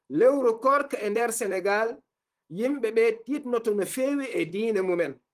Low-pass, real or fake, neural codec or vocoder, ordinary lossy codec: 14.4 kHz; fake; vocoder, 44.1 kHz, 128 mel bands, Pupu-Vocoder; Opus, 24 kbps